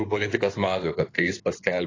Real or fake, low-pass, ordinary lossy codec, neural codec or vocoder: fake; 7.2 kHz; AAC, 32 kbps; codec, 16 kHz in and 24 kHz out, 2.2 kbps, FireRedTTS-2 codec